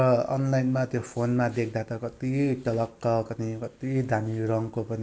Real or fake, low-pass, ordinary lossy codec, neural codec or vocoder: real; none; none; none